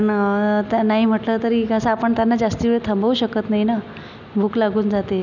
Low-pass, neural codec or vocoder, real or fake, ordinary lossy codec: 7.2 kHz; none; real; none